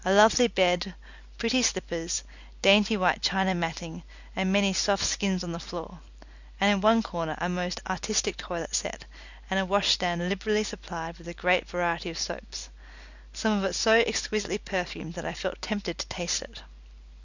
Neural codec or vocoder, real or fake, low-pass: none; real; 7.2 kHz